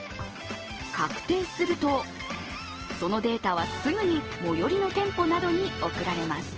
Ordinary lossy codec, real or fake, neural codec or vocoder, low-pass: Opus, 16 kbps; real; none; 7.2 kHz